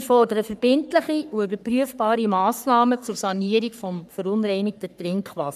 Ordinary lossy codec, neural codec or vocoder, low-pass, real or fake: none; codec, 44.1 kHz, 3.4 kbps, Pupu-Codec; 14.4 kHz; fake